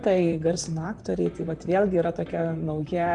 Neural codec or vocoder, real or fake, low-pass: vocoder, 44.1 kHz, 128 mel bands, Pupu-Vocoder; fake; 10.8 kHz